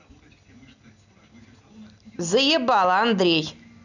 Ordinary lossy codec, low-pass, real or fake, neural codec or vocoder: none; 7.2 kHz; real; none